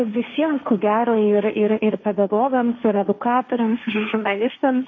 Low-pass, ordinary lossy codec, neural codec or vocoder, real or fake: 7.2 kHz; MP3, 32 kbps; codec, 16 kHz, 1.1 kbps, Voila-Tokenizer; fake